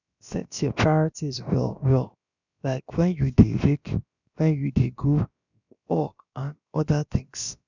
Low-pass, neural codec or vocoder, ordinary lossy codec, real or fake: 7.2 kHz; codec, 16 kHz, about 1 kbps, DyCAST, with the encoder's durations; none; fake